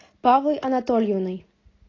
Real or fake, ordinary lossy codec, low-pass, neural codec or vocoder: real; AAC, 32 kbps; 7.2 kHz; none